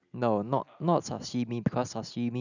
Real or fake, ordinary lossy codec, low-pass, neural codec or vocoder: real; none; 7.2 kHz; none